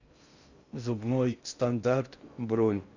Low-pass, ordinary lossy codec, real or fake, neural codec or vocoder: 7.2 kHz; Opus, 64 kbps; fake; codec, 16 kHz in and 24 kHz out, 0.6 kbps, FocalCodec, streaming, 2048 codes